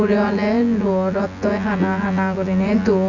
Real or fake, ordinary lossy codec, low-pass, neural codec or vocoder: fake; none; 7.2 kHz; vocoder, 24 kHz, 100 mel bands, Vocos